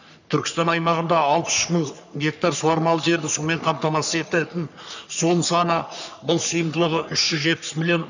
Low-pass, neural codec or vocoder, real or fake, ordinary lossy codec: 7.2 kHz; codec, 44.1 kHz, 3.4 kbps, Pupu-Codec; fake; none